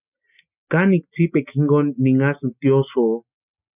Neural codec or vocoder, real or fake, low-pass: none; real; 3.6 kHz